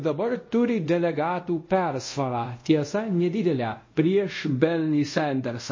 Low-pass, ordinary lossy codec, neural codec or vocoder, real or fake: 7.2 kHz; MP3, 32 kbps; codec, 24 kHz, 0.5 kbps, DualCodec; fake